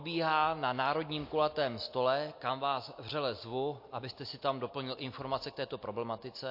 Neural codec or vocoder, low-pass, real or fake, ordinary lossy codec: none; 5.4 kHz; real; MP3, 32 kbps